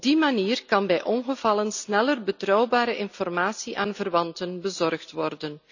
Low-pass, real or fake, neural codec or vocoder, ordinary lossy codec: 7.2 kHz; real; none; none